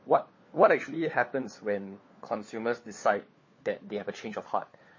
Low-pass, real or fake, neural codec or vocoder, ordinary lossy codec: 7.2 kHz; fake; codec, 16 kHz, 4 kbps, FunCodec, trained on LibriTTS, 50 frames a second; MP3, 32 kbps